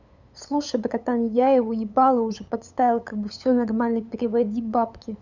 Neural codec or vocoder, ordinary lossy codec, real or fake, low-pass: codec, 16 kHz, 8 kbps, FunCodec, trained on LibriTTS, 25 frames a second; none; fake; 7.2 kHz